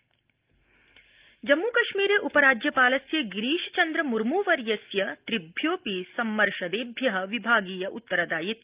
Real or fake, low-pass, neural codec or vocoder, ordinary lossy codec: real; 3.6 kHz; none; Opus, 32 kbps